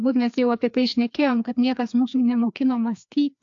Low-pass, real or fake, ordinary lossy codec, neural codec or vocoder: 7.2 kHz; fake; AAC, 64 kbps; codec, 16 kHz, 2 kbps, FreqCodec, larger model